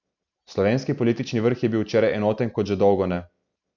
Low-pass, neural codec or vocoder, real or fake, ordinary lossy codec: 7.2 kHz; none; real; none